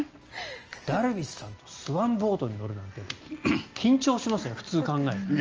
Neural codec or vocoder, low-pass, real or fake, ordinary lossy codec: none; 7.2 kHz; real; Opus, 24 kbps